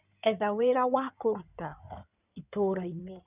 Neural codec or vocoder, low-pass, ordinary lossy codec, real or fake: codec, 16 kHz in and 24 kHz out, 2.2 kbps, FireRedTTS-2 codec; 3.6 kHz; none; fake